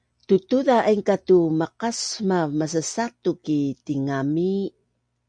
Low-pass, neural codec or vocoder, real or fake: 9.9 kHz; none; real